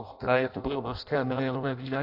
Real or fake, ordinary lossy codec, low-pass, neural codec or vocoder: fake; AAC, 48 kbps; 5.4 kHz; codec, 16 kHz in and 24 kHz out, 0.6 kbps, FireRedTTS-2 codec